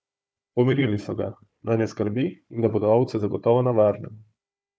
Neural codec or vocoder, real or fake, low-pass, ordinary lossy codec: codec, 16 kHz, 4 kbps, FunCodec, trained on Chinese and English, 50 frames a second; fake; none; none